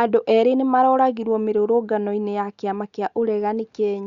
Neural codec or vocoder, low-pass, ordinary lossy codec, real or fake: none; 7.2 kHz; Opus, 64 kbps; real